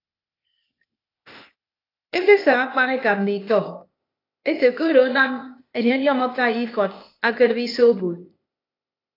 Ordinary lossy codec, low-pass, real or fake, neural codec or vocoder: AAC, 32 kbps; 5.4 kHz; fake; codec, 16 kHz, 0.8 kbps, ZipCodec